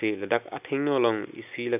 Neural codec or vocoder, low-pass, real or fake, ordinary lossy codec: none; 3.6 kHz; real; none